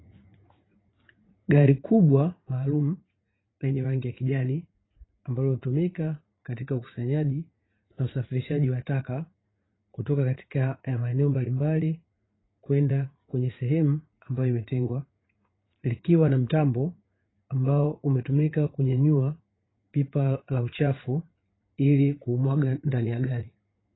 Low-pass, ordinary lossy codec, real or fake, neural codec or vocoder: 7.2 kHz; AAC, 16 kbps; fake; vocoder, 44.1 kHz, 80 mel bands, Vocos